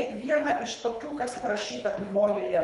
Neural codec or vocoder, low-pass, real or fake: codec, 24 kHz, 3 kbps, HILCodec; 10.8 kHz; fake